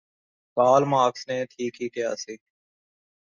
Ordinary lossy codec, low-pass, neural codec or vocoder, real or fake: Opus, 64 kbps; 7.2 kHz; none; real